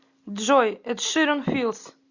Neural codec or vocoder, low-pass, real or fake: none; 7.2 kHz; real